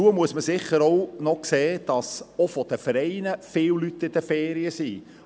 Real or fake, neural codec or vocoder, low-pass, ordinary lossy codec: real; none; none; none